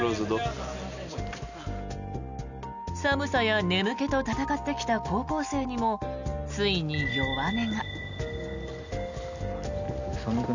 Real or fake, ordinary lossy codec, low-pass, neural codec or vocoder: real; none; 7.2 kHz; none